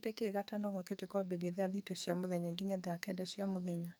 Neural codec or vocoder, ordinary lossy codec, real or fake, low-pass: codec, 44.1 kHz, 2.6 kbps, SNAC; none; fake; none